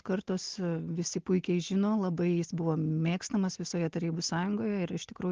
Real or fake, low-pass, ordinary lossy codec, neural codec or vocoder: real; 7.2 kHz; Opus, 16 kbps; none